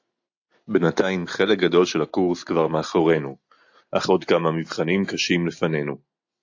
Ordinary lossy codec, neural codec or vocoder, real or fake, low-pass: AAC, 48 kbps; none; real; 7.2 kHz